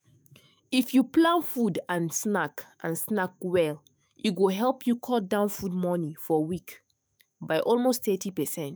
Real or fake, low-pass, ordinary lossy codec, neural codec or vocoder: fake; none; none; autoencoder, 48 kHz, 128 numbers a frame, DAC-VAE, trained on Japanese speech